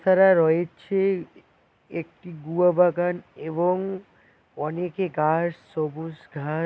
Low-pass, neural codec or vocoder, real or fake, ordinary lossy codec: none; none; real; none